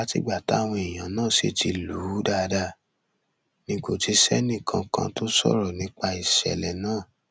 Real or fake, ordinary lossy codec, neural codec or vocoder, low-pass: real; none; none; none